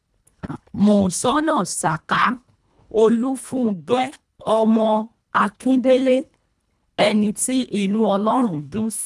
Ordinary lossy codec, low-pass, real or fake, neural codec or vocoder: none; none; fake; codec, 24 kHz, 1.5 kbps, HILCodec